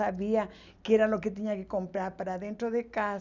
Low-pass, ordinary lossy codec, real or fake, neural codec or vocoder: 7.2 kHz; none; real; none